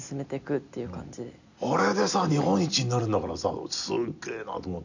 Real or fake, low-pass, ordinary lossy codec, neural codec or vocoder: real; 7.2 kHz; none; none